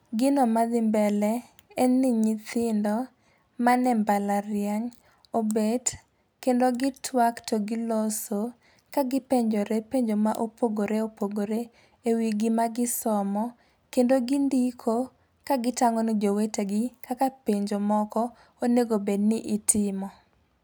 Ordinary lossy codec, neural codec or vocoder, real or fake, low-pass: none; none; real; none